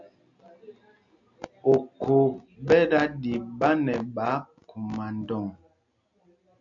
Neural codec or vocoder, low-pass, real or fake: none; 7.2 kHz; real